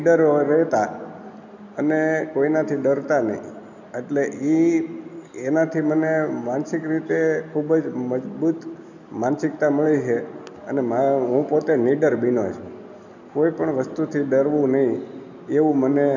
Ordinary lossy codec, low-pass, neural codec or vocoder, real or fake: none; 7.2 kHz; none; real